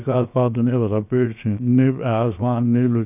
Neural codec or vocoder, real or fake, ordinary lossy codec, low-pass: codec, 16 kHz, 0.7 kbps, FocalCodec; fake; none; 3.6 kHz